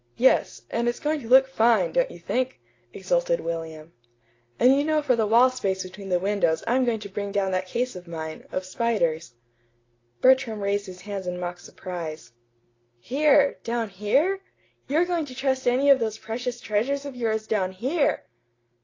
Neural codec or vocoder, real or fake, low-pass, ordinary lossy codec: none; real; 7.2 kHz; AAC, 32 kbps